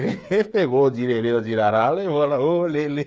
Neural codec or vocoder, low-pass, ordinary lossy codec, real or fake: codec, 16 kHz, 8 kbps, FreqCodec, smaller model; none; none; fake